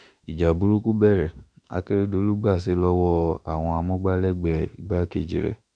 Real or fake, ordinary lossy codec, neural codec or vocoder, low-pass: fake; none; autoencoder, 48 kHz, 32 numbers a frame, DAC-VAE, trained on Japanese speech; 9.9 kHz